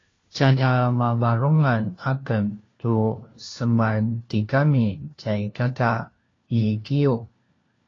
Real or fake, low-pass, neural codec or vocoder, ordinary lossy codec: fake; 7.2 kHz; codec, 16 kHz, 1 kbps, FunCodec, trained on LibriTTS, 50 frames a second; AAC, 32 kbps